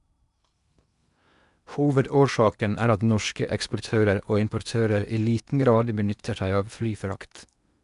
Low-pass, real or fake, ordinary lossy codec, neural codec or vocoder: 10.8 kHz; fake; none; codec, 16 kHz in and 24 kHz out, 0.8 kbps, FocalCodec, streaming, 65536 codes